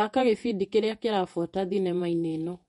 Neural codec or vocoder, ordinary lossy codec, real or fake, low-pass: vocoder, 48 kHz, 128 mel bands, Vocos; MP3, 48 kbps; fake; 19.8 kHz